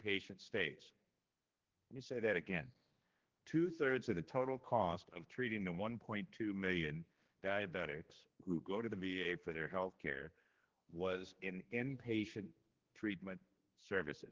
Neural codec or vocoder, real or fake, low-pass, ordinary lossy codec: codec, 16 kHz, 2 kbps, X-Codec, HuBERT features, trained on general audio; fake; 7.2 kHz; Opus, 16 kbps